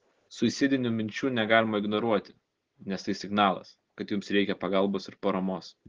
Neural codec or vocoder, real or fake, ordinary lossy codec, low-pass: none; real; Opus, 16 kbps; 7.2 kHz